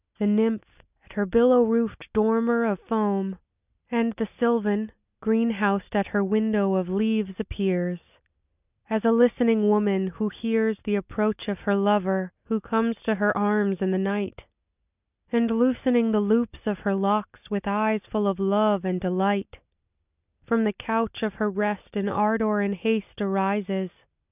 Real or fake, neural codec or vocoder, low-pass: real; none; 3.6 kHz